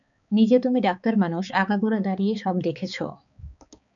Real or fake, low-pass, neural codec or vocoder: fake; 7.2 kHz; codec, 16 kHz, 4 kbps, X-Codec, HuBERT features, trained on balanced general audio